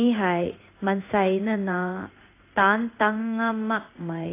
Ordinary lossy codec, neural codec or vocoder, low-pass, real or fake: AAC, 24 kbps; codec, 16 kHz in and 24 kHz out, 1 kbps, XY-Tokenizer; 3.6 kHz; fake